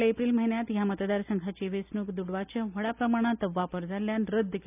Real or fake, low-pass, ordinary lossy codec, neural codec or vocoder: real; 3.6 kHz; none; none